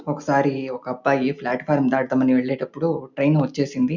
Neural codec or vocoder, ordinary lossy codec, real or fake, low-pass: none; none; real; 7.2 kHz